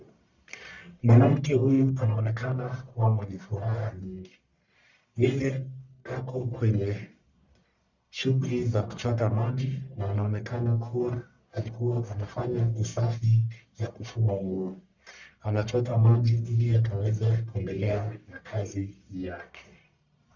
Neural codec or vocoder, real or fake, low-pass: codec, 44.1 kHz, 1.7 kbps, Pupu-Codec; fake; 7.2 kHz